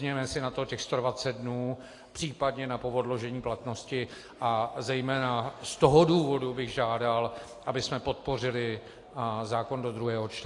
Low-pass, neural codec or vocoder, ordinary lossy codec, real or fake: 10.8 kHz; none; AAC, 48 kbps; real